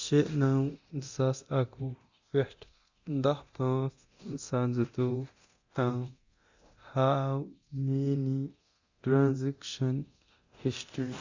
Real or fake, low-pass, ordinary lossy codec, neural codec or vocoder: fake; 7.2 kHz; Opus, 64 kbps; codec, 24 kHz, 0.9 kbps, DualCodec